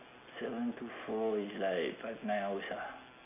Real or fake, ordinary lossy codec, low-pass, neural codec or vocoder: real; none; 3.6 kHz; none